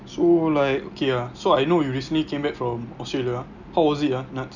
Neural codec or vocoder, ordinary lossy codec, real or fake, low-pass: none; none; real; 7.2 kHz